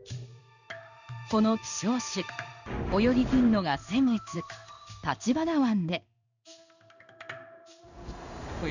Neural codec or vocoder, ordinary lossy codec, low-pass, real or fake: codec, 16 kHz in and 24 kHz out, 1 kbps, XY-Tokenizer; none; 7.2 kHz; fake